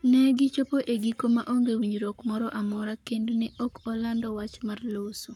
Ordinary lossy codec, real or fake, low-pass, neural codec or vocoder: none; fake; 19.8 kHz; codec, 44.1 kHz, 7.8 kbps, Pupu-Codec